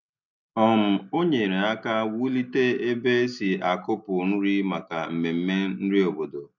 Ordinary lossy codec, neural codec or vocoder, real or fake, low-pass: none; none; real; 7.2 kHz